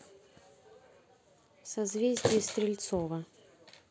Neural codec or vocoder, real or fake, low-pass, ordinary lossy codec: none; real; none; none